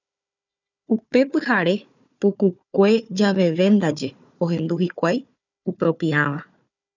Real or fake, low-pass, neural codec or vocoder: fake; 7.2 kHz; codec, 16 kHz, 4 kbps, FunCodec, trained on Chinese and English, 50 frames a second